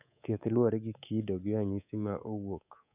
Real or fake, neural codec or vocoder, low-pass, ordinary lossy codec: fake; codec, 24 kHz, 3.1 kbps, DualCodec; 3.6 kHz; none